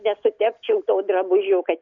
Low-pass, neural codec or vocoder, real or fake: 7.2 kHz; none; real